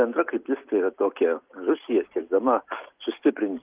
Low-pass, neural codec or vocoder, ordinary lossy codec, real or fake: 3.6 kHz; none; Opus, 32 kbps; real